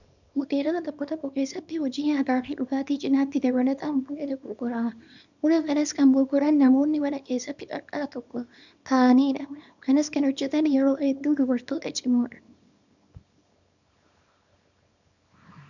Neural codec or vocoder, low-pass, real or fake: codec, 24 kHz, 0.9 kbps, WavTokenizer, small release; 7.2 kHz; fake